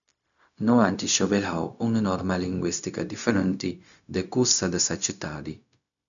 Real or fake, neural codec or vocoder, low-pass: fake; codec, 16 kHz, 0.4 kbps, LongCat-Audio-Codec; 7.2 kHz